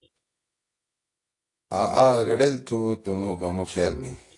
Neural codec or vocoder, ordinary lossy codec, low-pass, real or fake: codec, 24 kHz, 0.9 kbps, WavTokenizer, medium music audio release; AAC, 48 kbps; 10.8 kHz; fake